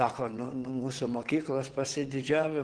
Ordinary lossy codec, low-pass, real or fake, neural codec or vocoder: Opus, 16 kbps; 9.9 kHz; fake; vocoder, 22.05 kHz, 80 mel bands, WaveNeXt